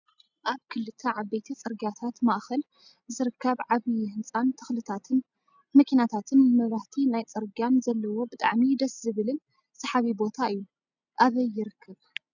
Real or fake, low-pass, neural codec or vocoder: real; 7.2 kHz; none